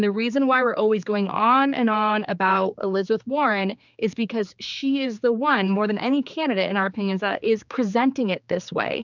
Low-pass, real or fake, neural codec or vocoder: 7.2 kHz; fake; codec, 16 kHz, 4 kbps, X-Codec, HuBERT features, trained on general audio